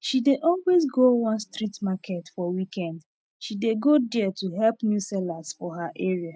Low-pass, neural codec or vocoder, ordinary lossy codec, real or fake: none; none; none; real